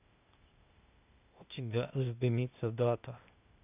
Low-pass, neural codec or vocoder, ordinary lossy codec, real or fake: 3.6 kHz; codec, 16 kHz, 0.8 kbps, ZipCodec; none; fake